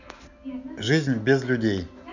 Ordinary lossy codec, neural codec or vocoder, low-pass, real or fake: none; none; 7.2 kHz; real